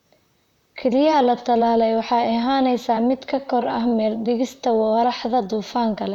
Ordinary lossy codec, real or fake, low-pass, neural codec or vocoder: none; fake; 19.8 kHz; vocoder, 44.1 kHz, 128 mel bands, Pupu-Vocoder